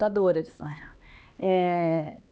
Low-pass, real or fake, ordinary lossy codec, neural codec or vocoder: none; fake; none; codec, 16 kHz, 2 kbps, X-Codec, HuBERT features, trained on LibriSpeech